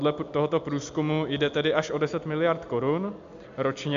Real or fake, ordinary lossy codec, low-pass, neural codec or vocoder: real; AAC, 64 kbps; 7.2 kHz; none